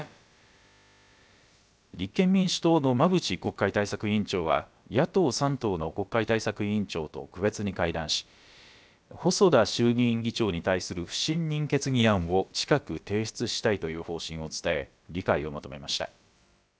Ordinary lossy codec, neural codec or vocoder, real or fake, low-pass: none; codec, 16 kHz, about 1 kbps, DyCAST, with the encoder's durations; fake; none